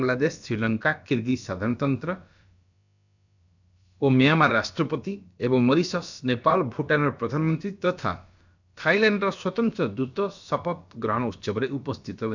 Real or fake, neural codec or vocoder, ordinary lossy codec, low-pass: fake; codec, 16 kHz, about 1 kbps, DyCAST, with the encoder's durations; none; 7.2 kHz